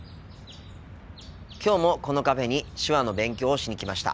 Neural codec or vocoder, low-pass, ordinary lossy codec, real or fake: none; none; none; real